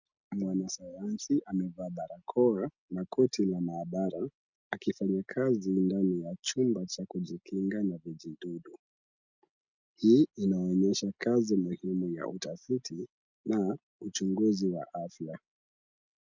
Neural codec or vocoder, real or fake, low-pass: none; real; 7.2 kHz